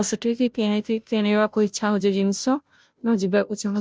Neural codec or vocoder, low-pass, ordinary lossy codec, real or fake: codec, 16 kHz, 0.5 kbps, FunCodec, trained on Chinese and English, 25 frames a second; none; none; fake